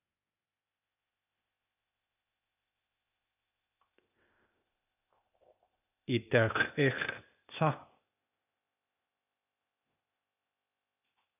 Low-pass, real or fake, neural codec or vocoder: 3.6 kHz; fake; codec, 16 kHz, 0.8 kbps, ZipCodec